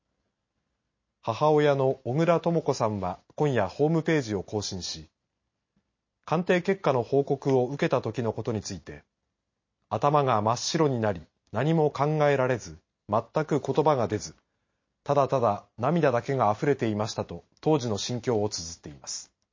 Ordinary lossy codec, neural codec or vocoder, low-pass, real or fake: MP3, 32 kbps; none; 7.2 kHz; real